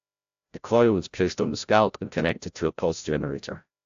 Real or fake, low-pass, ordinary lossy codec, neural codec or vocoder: fake; 7.2 kHz; AAC, 64 kbps; codec, 16 kHz, 0.5 kbps, FreqCodec, larger model